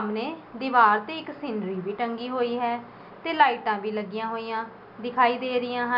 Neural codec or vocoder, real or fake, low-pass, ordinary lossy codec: none; real; 5.4 kHz; none